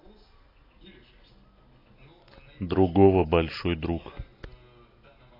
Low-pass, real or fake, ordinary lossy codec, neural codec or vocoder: 5.4 kHz; real; AAC, 24 kbps; none